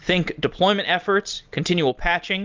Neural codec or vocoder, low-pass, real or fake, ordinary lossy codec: none; 7.2 kHz; real; Opus, 24 kbps